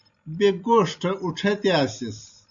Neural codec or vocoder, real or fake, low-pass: none; real; 7.2 kHz